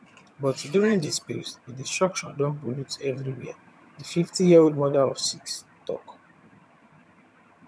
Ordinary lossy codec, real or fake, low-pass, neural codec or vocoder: none; fake; none; vocoder, 22.05 kHz, 80 mel bands, HiFi-GAN